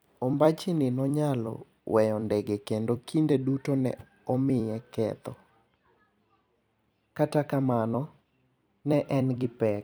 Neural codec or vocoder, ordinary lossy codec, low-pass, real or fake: vocoder, 44.1 kHz, 128 mel bands every 256 samples, BigVGAN v2; none; none; fake